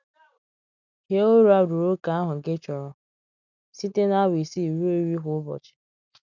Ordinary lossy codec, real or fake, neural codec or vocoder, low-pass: none; real; none; 7.2 kHz